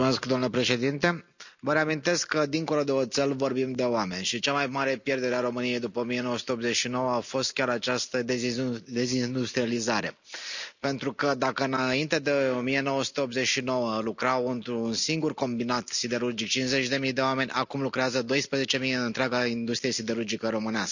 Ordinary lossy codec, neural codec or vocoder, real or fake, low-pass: none; none; real; 7.2 kHz